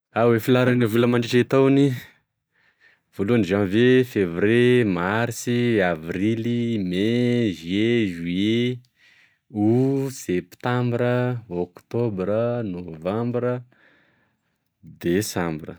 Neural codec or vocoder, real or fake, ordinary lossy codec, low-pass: none; real; none; none